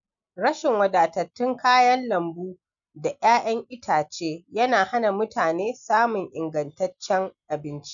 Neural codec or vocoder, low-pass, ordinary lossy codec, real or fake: none; 7.2 kHz; none; real